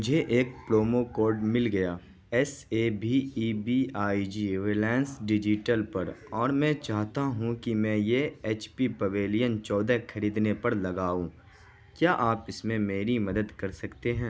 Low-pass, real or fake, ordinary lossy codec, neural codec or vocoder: none; real; none; none